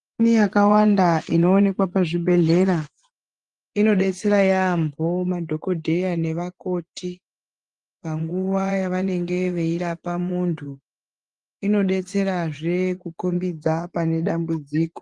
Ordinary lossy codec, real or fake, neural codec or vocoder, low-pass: Opus, 24 kbps; fake; vocoder, 24 kHz, 100 mel bands, Vocos; 10.8 kHz